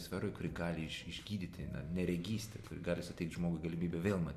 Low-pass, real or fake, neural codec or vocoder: 14.4 kHz; real; none